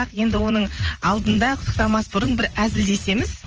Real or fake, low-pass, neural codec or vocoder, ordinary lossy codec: fake; 7.2 kHz; vocoder, 44.1 kHz, 80 mel bands, Vocos; Opus, 24 kbps